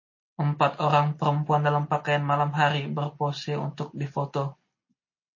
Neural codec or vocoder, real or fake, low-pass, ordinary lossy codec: none; real; 7.2 kHz; MP3, 32 kbps